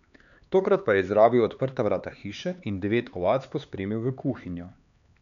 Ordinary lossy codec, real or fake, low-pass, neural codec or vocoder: none; fake; 7.2 kHz; codec, 16 kHz, 4 kbps, X-Codec, HuBERT features, trained on LibriSpeech